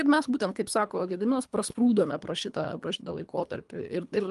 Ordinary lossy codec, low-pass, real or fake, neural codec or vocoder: Opus, 32 kbps; 10.8 kHz; fake; codec, 24 kHz, 3 kbps, HILCodec